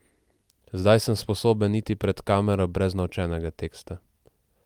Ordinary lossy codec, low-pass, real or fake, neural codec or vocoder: Opus, 32 kbps; 19.8 kHz; real; none